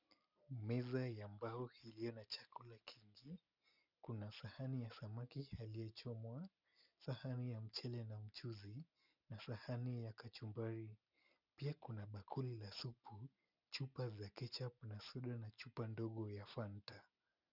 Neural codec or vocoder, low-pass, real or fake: none; 5.4 kHz; real